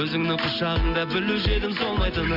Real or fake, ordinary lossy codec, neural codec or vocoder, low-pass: real; AAC, 32 kbps; none; 5.4 kHz